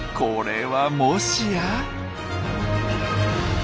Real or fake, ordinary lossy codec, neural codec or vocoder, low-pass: real; none; none; none